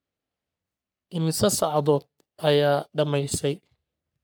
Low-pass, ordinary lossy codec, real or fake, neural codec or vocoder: none; none; fake; codec, 44.1 kHz, 3.4 kbps, Pupu-Codec